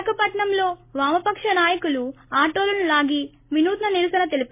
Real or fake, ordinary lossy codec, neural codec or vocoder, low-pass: real; MP3, 24 kbps; none; 3.6 kHz